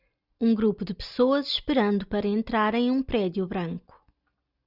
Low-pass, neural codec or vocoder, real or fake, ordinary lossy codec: 5.4 kHz; none; real; Opus, 64 kbps